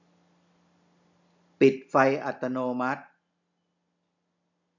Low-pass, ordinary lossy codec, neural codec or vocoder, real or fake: 7.2 kHz; none; none; real